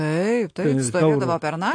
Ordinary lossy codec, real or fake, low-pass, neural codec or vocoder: AAC, 48 kbps; real; 9.9 kHz; none